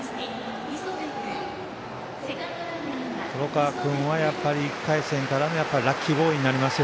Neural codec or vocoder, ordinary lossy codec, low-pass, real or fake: none; none; none; real